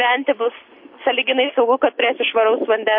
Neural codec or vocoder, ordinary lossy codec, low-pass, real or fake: none; MP3, 32 kbps; 5.4 kHz; real